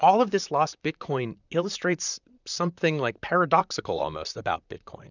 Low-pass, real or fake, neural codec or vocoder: 7.2 kHz; fake; vocoder, 44.1 kHz, 128 mel bands, Pupu-Vocoder